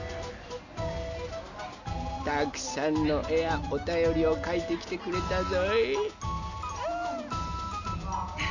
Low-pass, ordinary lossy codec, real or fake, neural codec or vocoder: 7.2 kHz; none; real; none